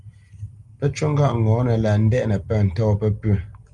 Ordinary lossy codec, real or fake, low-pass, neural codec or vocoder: Opus, 32 kbps; real; 10.8 kHz; none